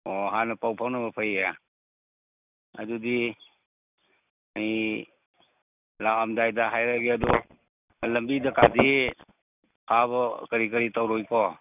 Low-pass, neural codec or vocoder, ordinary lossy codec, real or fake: 3.6 kHz; none; none; real